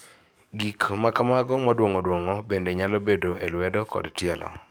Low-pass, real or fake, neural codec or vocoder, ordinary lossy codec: none; fake; codec, 44.1 kHz, 7.8 kbps, DAC; none